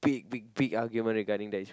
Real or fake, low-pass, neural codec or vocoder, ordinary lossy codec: real; none; none; none